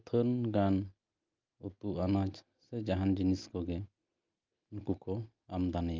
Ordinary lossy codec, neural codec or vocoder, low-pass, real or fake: Opus, 32 kbps; none; 7.2 kHz; real